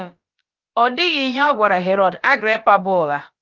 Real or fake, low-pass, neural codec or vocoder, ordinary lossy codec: fake; 7.2 kHz; codec, 16 kHz, about 1 kbps, DyCAST, with the encoder's durations; Opus, 24 kbps